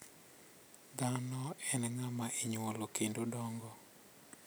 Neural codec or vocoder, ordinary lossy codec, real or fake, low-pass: none; none; real; none